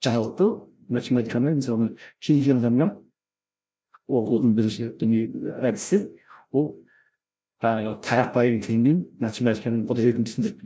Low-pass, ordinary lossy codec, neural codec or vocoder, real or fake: none; none; codec, 16 kHz, 0.5 kbps, FreqCodec, larger model; fake